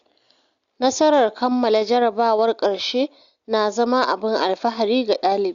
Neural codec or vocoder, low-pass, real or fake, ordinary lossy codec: none; 7.2 kHz; real; Opus, 64 kbps